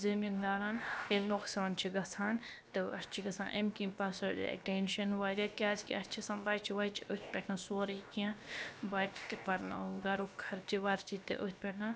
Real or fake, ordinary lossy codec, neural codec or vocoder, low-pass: fake; none; codec, 16 kHz, about 1 kbps, DyCAST, with the encoder's durations; none